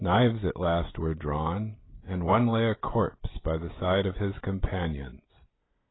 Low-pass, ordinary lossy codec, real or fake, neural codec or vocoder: 7.2 kHz; AAC, 16 kbps; real; none